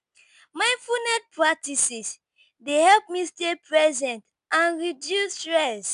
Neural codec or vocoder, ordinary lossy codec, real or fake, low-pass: none; none; real; 10.8 kHz